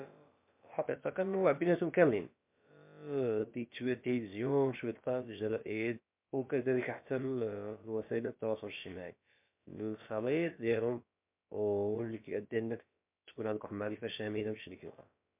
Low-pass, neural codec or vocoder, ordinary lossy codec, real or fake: 3.6 kHz; codec, 16 kHz, about 1 kbps, DyCAST, with the encoder's durations; MP3, 32 kbps; fake